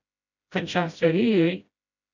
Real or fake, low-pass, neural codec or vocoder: fake; 7.2 kHz; codec, 16 kHz, 0.5 kbps, FreqCodec, smaller model